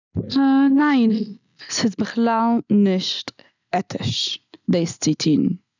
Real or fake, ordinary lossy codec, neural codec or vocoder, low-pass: real; none; none; 7.2 kHz